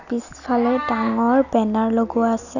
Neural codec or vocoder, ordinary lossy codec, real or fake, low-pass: none; none; real; 7.2 kHz